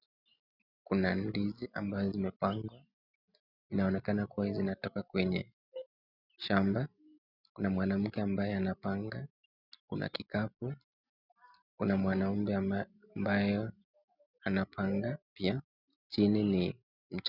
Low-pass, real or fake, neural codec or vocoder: 5.4 kHz; real; none